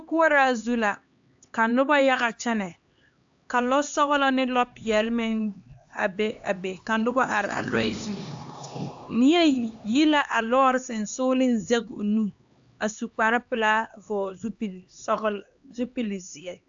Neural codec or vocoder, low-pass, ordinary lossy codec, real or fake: codec, 16 kHz, 2 kbps, X-Codec, HuBERT features, trained on LibriSpeech; 7.2 kHz; AAC, 64 kbps; fake